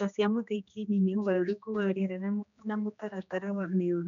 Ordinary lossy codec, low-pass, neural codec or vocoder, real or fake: none; 7.2 kHz; codec, 16 kHz, 2 kbps, X-Codec, HuBERT features, trained on general audio; fake